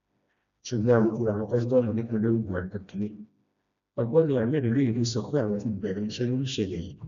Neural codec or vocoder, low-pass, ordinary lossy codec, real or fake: codec, 16 kHz, 1 kbps, FreqCodec, smaller model; 7.2 kHz; MP3, 96 kbps; fake